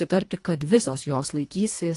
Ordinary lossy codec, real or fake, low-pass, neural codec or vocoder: AAC, 48 kbps; fake; 10.8 kHz; codec, 24 kHz, 1.5 kbps, HILCodec